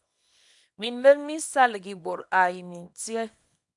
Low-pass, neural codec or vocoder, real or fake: 10.8 kHz; codec, 24 kHz, 0.9 kbps, WavTokenizer, small release; fake